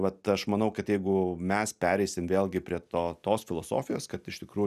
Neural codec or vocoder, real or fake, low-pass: none; real; 14.4 kHz